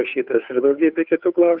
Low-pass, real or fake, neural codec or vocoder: 5.4 kHz; fake; codec, 16 kHz, 2 kbps, FunCodec, trained on Chinese and English, 25 frames a second